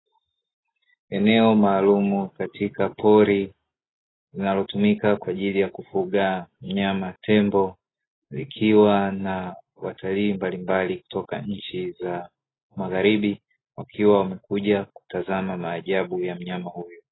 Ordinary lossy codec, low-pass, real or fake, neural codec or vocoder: AAC, 16 kbps; 7.2 kHz; real; none